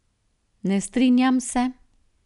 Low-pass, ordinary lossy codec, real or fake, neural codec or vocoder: 10.8 kHz; none; real; none